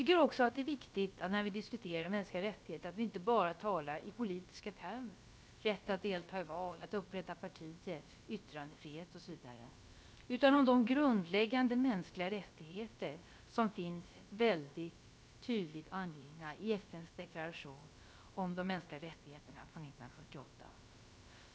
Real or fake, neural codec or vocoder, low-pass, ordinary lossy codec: fake; codec, 16 kHz, about 1 kbps, DyCAST, with the encoder's durations; none; none